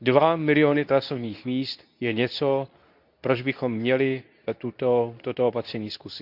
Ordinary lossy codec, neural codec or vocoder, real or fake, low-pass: none; codec, 24 kHz, 0.9 kbps, WavTokenizer, medium speech release version 2; fake; 5.4 kHz